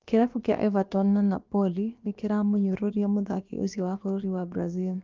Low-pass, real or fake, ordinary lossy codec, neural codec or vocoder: 7.2 kHz; fake; Opus, 24 kbps; codec, 16 kHz, about 1 kbps, DyCAST, with the encoder's durations